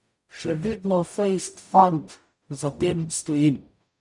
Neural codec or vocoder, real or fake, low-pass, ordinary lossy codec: codec, 44.1 kHz, 0.9 kbps, DAC; fake; 10.8 kHz; none